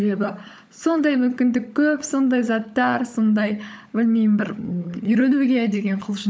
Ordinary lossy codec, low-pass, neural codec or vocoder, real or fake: none; none; codec, 16 kHz, 16 kbps, FunCodec, trained on LibriTTS, 50 frames a second; fake